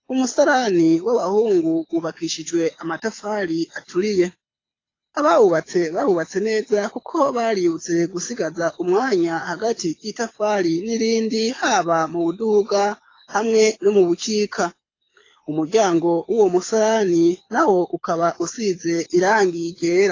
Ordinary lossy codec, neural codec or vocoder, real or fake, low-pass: AAC, 32 kbps; codec, 24 kHz, 6 kbps, HILCodec; fake; 7.2 kHz